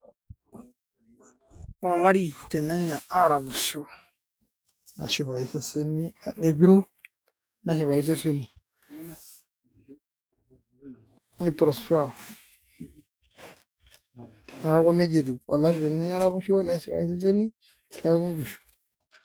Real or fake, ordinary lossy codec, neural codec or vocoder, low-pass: fake; none; codec, 44.1 kHz, 2.6 kbps, DAC; none